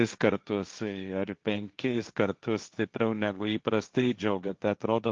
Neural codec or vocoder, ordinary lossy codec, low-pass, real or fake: codec, 16 kHz, 1.1 kbps, Voila-Tokenizer; Opus, 24 kbps; 7.2 kHz; fake